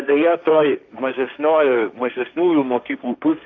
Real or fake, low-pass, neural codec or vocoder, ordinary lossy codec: fake; 7.2 kHz; codec, 16 kHz, 1.1 kbps, Voila-Tokenizer; Opus, 64 kbps